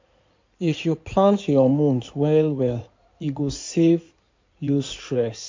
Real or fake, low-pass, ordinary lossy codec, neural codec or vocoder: fake; 7.2 kHz; MP3, 48 kbps; codec, 16 kHz in and 24 kHz out, 2.2 kbps, FireRedTTS-2 codec